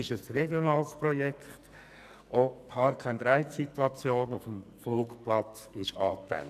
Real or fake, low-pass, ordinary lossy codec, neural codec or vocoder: fake; 14.4 kHz; none; codec, 44.1 kHz, 2.6 kbps, SNAC